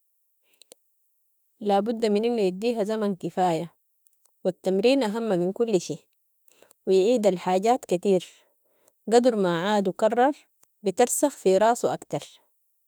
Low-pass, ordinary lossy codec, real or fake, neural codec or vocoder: none; none; fake; autoencoder, 48 kHz, 32 numbers a frame, DAC-VAE, trained on Japanese speech